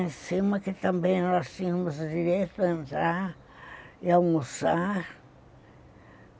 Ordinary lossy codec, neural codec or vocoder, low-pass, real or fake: none; none; none; real